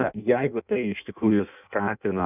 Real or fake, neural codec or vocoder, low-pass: fake; codec, 16 kHz in and 24 kHz out, 0.6 kbps, FireRedTTS-2 codec; 3.6 kHz